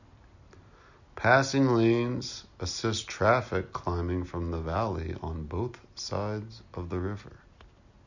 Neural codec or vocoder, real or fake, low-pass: none; real; 7.2 kHz